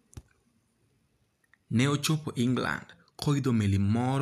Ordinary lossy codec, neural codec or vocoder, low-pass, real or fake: none; none; 14.4 kHz; real